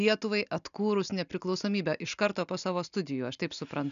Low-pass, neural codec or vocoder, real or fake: 7.2 kHz; none; real